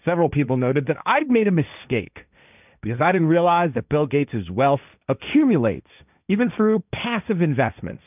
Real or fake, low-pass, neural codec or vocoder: fake; 3.6 kHz; codec, 16 kHz, 1.1 kbps, Voila-Tokenizer